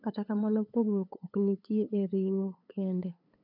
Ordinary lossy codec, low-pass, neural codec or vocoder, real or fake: none; 5.4 kHz; codec, 16 kHz, 8 kbps, FunCodec, trained on LibriTTS, 25 frames a second; fake